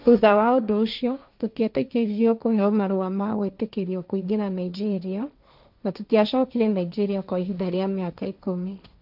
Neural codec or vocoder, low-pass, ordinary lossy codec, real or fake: codec, 16 kHz, 1.1 kbps, Voila-Tokenizer; 5.4 kHz; none; fake